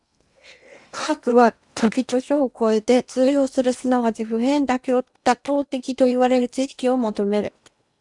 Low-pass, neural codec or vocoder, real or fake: 10.8 kHz; codec, 16 kHz in and 24 kHz out, 0.8 kbps, FocalCodec, streaming, 65536 codes; fake